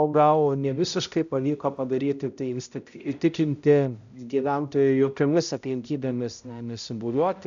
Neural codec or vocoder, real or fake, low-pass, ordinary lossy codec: codec, 16 kHz, 0.5 kbps, X-Codec, HuBERT features, trained on balanced general audio; fake; 7.2 kHz; AAC, 96 kbps